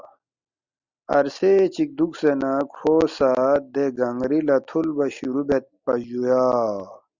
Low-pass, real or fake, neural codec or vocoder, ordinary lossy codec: 7.2 kHz; real; none; Opus, 64 kbps